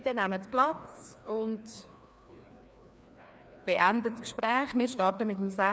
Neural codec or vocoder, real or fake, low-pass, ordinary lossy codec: codec, 16 kHz, 2 kbps, FreqCodec, larger model; fake; none; none